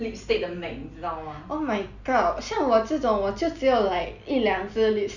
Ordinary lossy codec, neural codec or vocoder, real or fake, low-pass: none; none; real; 7.2 kHz